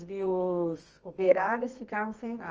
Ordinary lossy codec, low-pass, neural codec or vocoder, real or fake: Opus, 24 kbps; 7.2 kHz; codec, 24 kHz, 0.9 kbps, WavTokenizer, medium music audio release; fake